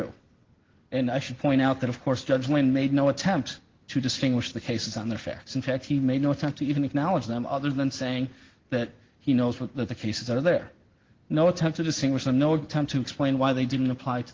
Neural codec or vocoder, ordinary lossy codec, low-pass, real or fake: none; Opus, 16 kbps; 7.2 kHz; real